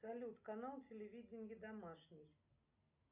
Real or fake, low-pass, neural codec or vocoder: real; 3.6 kHz; none